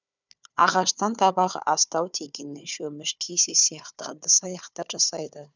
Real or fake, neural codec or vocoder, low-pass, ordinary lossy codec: fake; codec, 16 kHz, 4 kbps, FunCodec, trained on Chinese and English, 50 frames a second; 7.2 kHz; none